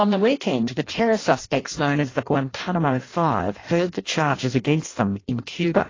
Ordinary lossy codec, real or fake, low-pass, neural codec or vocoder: AAC, 32 kbps; fake; 7.2 kHz; codec, 16 kHz in and 24 kHz out, 0.6 kbps, FireRedTTS-2 codec